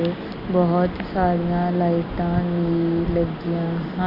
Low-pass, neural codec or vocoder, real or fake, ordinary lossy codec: 5.4 kHz; none; real; none